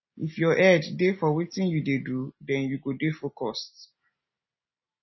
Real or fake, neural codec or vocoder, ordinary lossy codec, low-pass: real; none; MP3, 24 kbps; 7.2 kHz